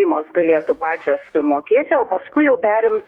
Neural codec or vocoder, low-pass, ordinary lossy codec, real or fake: codec, 44.1 kHz, 2.6 kbps, DAC; 19.8 kHz; Opus, 64 kbps; fake